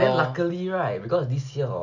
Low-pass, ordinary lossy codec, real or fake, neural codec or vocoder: 7.2 kHz; none; real; none